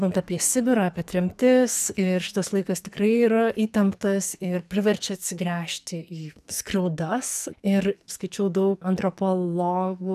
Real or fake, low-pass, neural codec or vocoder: fake; 14.4 kHz; codec, 44.1 kHz, 2.6 kbps, SNAC